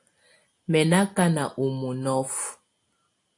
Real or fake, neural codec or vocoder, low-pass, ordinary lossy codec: real; none; 10.8 kHz; AAC, 48 kbps